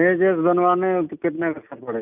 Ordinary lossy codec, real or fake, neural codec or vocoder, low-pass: none; real; none; 3.6 kHz